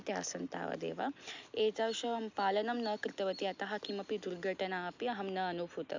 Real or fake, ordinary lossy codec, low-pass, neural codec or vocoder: real; MP3, 64 kbps; 7.2 kHz; none